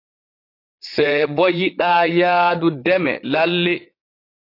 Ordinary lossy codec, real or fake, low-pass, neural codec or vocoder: AAC, 32 kbps; fake; 5.4 kHz; vocoder, 22.05 kHz, 80 mel bands, Vocos